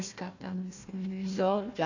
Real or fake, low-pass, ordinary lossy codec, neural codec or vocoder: fake; 7.2 kHz; none; codec, 16 kHz, 1 kbps, FunCodec, trained on Chinese and English, 50 frames a second